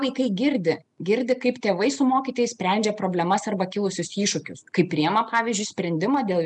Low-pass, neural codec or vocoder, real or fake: 10.8 kHz; none; real